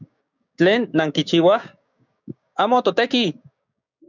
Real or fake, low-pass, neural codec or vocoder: fake; 7.2 kHz; codec, 16 kHz, 6 kbps, DAC